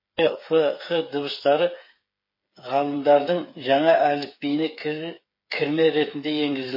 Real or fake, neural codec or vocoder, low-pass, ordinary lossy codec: fake; codec, 16 kHz, 8 kbps, FreqCodec, smaller model; 5.4 kHz; MP3, 24 kbps